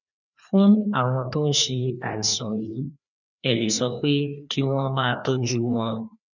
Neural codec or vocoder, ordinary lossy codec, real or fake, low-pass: codec, 16 kHz, 2 kbps, FreqCodec, larger model; none; fake; 7.2 kHz